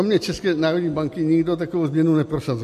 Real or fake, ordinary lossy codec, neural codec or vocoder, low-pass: real; MP3, 64 kbps; none; 14.4 kHz